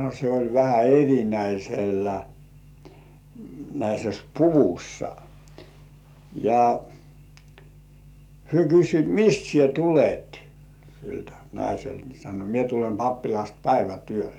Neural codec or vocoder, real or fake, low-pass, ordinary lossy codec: codec, 44.1 kHz, 7.8 kbps, DAC; fake; 19.8 kHz; none